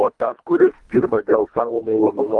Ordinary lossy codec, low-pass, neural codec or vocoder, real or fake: Opus, 32 kbps; 10.8 kHz; codec, 24 kHz, 1.5 kbps, HILCodec; fake